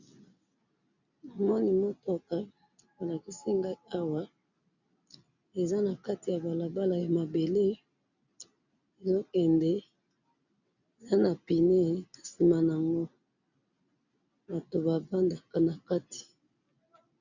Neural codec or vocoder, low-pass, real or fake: none; 7.2 kHz; real